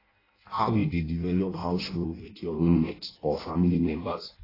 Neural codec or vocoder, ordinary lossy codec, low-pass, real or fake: codec, 16 kHz in and 24 kHz out, 0.6 kbps, FireRedTTS-2 codec; AAC, 24 kbps; 5.4 kHz; fake